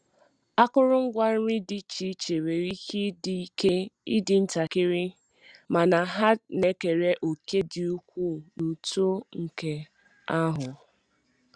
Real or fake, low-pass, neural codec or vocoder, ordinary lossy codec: real; 9.9 kHz; none; Opus, 64 kbps